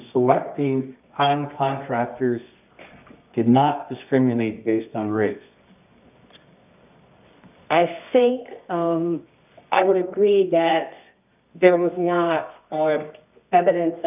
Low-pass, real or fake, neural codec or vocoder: 3.6 kHz; fake; codec, 24 kHz, 0.9 kbps, WavTokenizer, medium music audio release